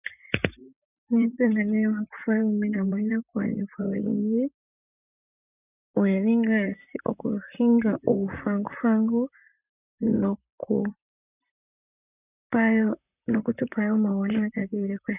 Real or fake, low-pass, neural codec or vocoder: fake; 3.6 kHz; vocoder, 44.1 kHz, 128 mel bands, Pupu-Vocoder